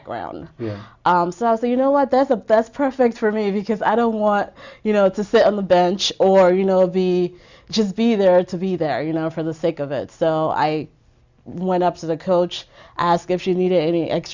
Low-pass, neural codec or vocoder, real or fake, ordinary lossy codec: 7.2 kHz; none; real; Opus, 64 kbps